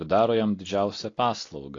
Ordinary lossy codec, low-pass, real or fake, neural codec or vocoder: AAC, 32 kbps; 7.2 kHz; real; none